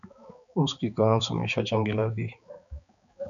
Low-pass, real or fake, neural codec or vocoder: 7.2 kHz; fake; codec, 16 kHz, 4 kbps, X-Codec, HuBERT features, trained on balanced general audio